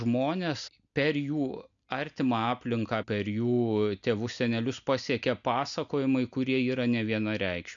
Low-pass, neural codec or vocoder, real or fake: 7.2 kHz; none; real